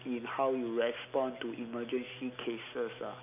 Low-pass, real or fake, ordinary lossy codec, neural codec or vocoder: 3.6 kHz; real; none; none